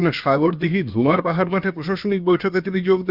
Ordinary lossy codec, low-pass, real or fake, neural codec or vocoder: Opus, 64 kbps; 5.4 kHz; fake; codec, 16 kHz, about 1 kbps, DyCAST, with the encoder's durations